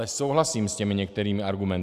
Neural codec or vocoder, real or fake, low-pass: none; real; 14.4 kHz